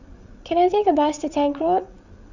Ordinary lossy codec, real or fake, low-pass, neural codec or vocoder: none; fake; 7.2 kHz; codec, 16 kHz, 8 kbps, FreqCodec, larger model